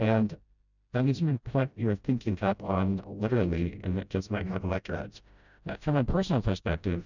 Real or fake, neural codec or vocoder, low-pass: fake; codec, 16 kHz, 0.5 kbps, FreqCodec, smaller model; 7.2 kHz